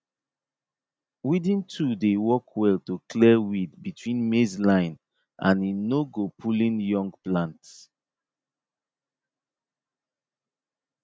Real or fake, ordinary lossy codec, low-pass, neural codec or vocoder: real; none; none; none